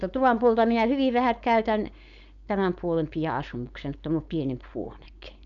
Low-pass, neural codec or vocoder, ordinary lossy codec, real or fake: 7.2 kHz; codec, 16 kHz, 4.8 kbps, FACodec; none; fake